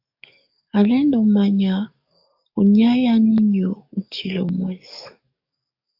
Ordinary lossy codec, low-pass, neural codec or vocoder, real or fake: AAC, 48 kbps; 5.4 kHz; codec, 44.1 kHz, 7.8 kbps, DAC; fake